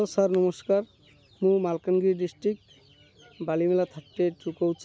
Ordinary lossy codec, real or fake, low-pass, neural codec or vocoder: none; real; none; none